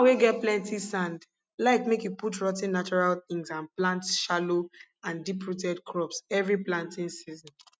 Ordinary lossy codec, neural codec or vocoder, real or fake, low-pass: none; none; real; none